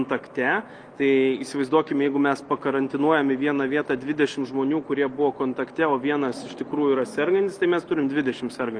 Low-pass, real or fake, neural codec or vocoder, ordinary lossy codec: 9.9 kHz; real; none; Opus, 32 kbps